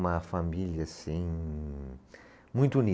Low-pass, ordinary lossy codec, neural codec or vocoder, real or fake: none; none; none; real